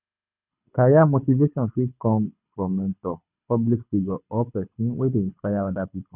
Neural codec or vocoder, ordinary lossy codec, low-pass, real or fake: codec, 24 kHz, 6 kbps, HILCodec; none; 3.6 kHz; fake